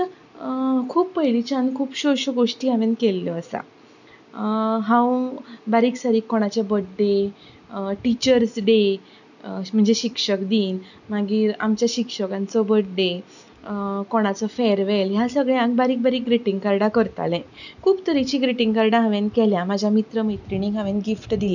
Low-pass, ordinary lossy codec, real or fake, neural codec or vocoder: 7.2 kHz; none; real; none